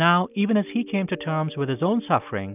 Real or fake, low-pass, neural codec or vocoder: real; 3.6 kHz; none